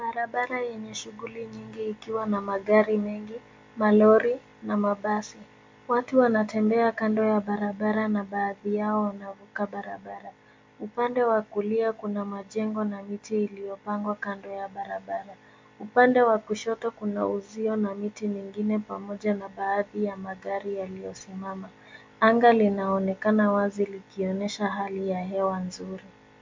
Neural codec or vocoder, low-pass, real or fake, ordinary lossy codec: none; 7.2 kHz; real; MP3, 48 kbps